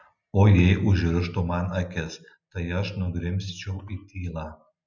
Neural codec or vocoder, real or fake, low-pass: none; real; 7.2 kHz